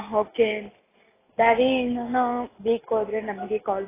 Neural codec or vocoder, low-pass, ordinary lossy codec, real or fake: none; 3.6 kHz; AAC, 16 kbps; real